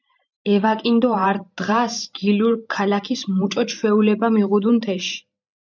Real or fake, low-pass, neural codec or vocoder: fake; 7.2 kHz; vocoder, 44.1 kHz, 128 mel bands every 512 samples, BigVGAN v2